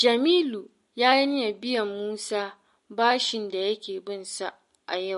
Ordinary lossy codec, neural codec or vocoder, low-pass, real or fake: MP3, 48 kbps; none; 14.4 kHz; real